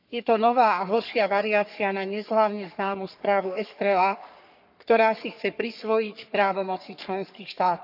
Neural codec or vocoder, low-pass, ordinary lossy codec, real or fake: codec, 44.1 kHz, 3.4 kbps, Pupu-Codec; 5.4 kHz; none; fake